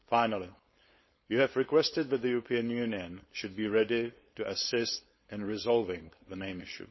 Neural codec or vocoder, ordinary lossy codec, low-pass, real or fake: codec, 16 kHz, 4.8 kbps, FACodec; MP3, 24 kbps; 7.2 kHz; fake